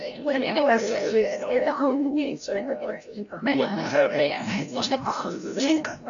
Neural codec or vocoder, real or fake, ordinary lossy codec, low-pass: codec, 16 kHz, 0.5 kbps, FreqCodec, larger model; fake; MP3, 96 kbps; 7.2 kHz